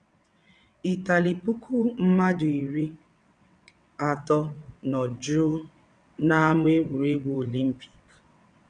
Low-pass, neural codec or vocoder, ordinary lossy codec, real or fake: 9.9 kHz; vocoder, 22.05 kHz, 80 mel bands, WaveNeXt; none; fake